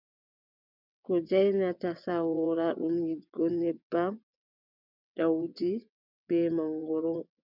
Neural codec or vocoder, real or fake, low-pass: vocoder, 22.05 kHz, 80 mel bands, WaveNeXt; fake; 5.4 kHz